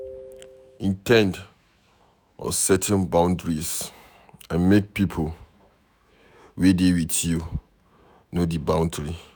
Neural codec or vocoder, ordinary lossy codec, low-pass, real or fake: autoencoder, 48 kHz, 128 numbers a frame, DAC-VAE, trained on Japanese speech; none; none; fake